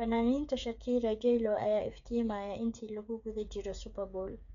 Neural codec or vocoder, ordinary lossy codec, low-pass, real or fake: codec, 16 kHz, 8 kbps, FreqCodec, smaller model; none; 7.2 kHz; fake